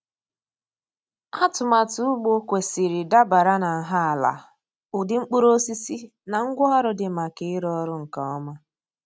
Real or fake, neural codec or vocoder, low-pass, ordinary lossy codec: real; none; none; none